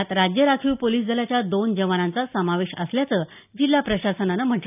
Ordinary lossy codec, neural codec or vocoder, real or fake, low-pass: none; none; real; 3.6 kHz